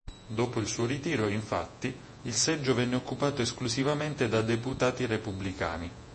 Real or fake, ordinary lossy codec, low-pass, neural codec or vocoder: fake; MP3, 32 kbps; 10.8 kHz; vocoder, 48 kHz, 128 mel bands, Vocos